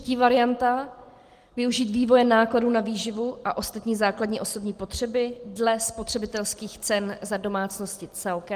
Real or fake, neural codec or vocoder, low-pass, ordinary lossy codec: real; none; 14.4 kHz; Opus, 24 kbps